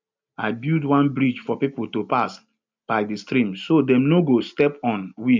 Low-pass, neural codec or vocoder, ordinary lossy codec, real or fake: 7.2 kHz; none; MP3, 64 kbps; real